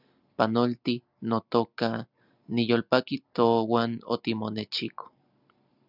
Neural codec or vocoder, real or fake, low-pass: none; real; 5.4 kHz